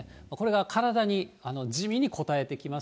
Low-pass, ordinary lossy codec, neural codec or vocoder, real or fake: none; none; none; real